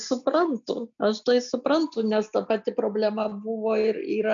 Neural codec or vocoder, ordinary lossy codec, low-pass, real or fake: none; MP3, 96 kbps; 7.2 kHz; real